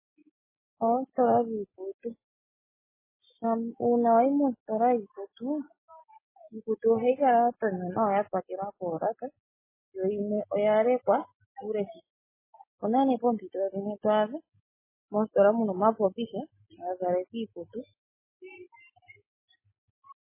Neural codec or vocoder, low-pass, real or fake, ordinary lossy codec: none; 3.6 kHz; real; MP3, 16 kbps